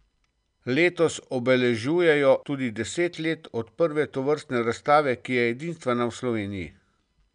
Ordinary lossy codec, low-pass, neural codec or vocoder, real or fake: none; 9.9 kHz; none; real